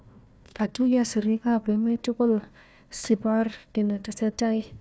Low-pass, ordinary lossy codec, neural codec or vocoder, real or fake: none; none; codec, 16 kHz, 1 kbps, FunCodec, trained on Chinese and English, 50 frames a second; fake